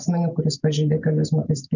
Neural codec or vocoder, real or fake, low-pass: none; real; 7.2 kHz